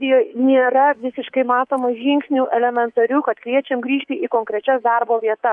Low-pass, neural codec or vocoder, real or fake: 10.8 kHz; codec, 24 kHz, 3.1 kbps, DualCodec; fake